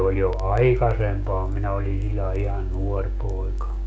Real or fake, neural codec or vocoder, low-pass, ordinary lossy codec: fake; codec, 16 kHz, 6 kbps, DAC; none; none